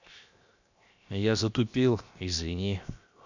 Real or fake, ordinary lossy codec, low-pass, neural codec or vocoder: fake; none; 7.2 kHz; codec, 16 kHz, 0.7 kbps, FocalCodec